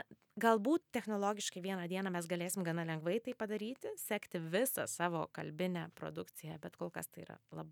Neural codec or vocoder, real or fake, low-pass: none; real; 19.8 kHz